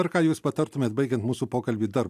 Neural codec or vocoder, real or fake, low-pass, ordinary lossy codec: none; real; 14.4 kHz; AAC, 96 kbps